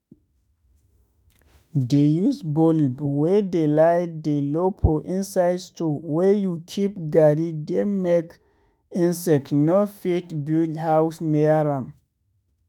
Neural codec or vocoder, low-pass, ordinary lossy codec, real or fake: autoencoder, 48 kHz, 32 numbers a frame, DAC-VAE, trained on Japanese speech; 19.8 kHz; none; fake